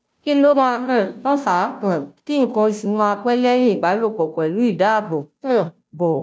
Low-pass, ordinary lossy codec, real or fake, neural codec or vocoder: none; none; fake; codec, 16 kHz, 0.5 kbps, FunCodec, trained on Chinese and English, 25 frames a second